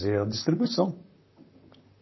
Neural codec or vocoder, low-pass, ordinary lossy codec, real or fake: vocoder, 44.1 kHz, 128 mel bands, Pupu-Vocoder; 7.2 kHz; MP3, 24 kbps; fake